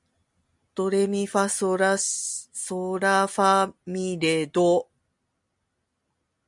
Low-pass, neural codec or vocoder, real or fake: 10.8 kHz; none; real